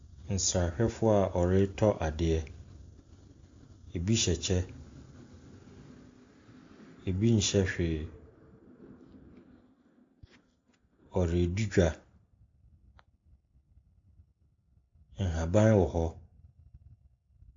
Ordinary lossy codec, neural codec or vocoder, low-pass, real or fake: AAC, 48 kbps; none; 7.2 kHz; real